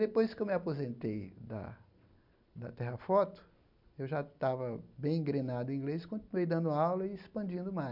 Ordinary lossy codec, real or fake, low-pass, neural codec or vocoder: none; real; 5.4 kHz; none